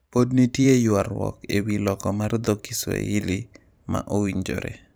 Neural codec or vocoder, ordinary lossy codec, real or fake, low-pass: vocoder, 44.1 kHz, 128 mel bands every 512 samples, BigVGAN v2; none; fake; none